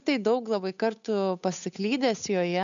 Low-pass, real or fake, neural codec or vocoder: 7.2 kHz; fake; codec, 16 kHz, 8 kbps, FunCodec, trained on Chinese and English, 25 frames a second